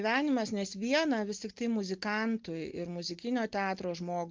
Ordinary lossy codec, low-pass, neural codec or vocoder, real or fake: Opus, 32 kbps; 7.2 kHz; none; real